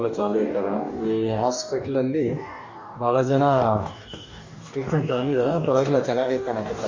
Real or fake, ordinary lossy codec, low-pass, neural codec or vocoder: fake; MP3, 48 kbps; 7.2 kHz; codec, 44.1 kHz, 2.6 kbps, DAC